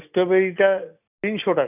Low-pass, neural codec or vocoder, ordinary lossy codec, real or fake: 3.6 kHz; none; none; real